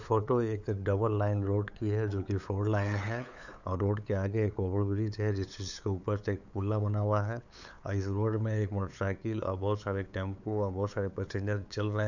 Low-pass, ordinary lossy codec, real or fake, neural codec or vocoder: 7.2 kHz; none; fake; codec, 16 kHz, 8 kbps, FunCodec, trained on LibriTTS, 25 frames a second